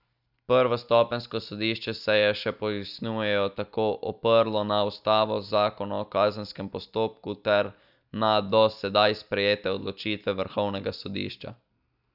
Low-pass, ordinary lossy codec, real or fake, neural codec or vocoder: 5.4 kHz; none; real; none